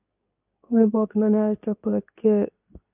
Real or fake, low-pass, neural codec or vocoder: fake; 3.6 kHz; codec, 24 kHz, 0.9 kbps, WavTokenizer, medium speech release version 2